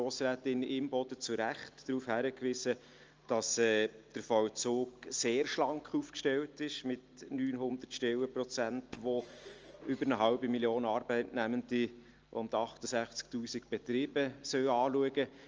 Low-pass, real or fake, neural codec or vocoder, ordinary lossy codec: 7.2 kHz; real; none; Opus, 24 kbps